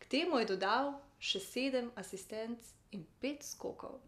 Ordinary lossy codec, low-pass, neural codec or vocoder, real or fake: none; 14.4 kHz; none; real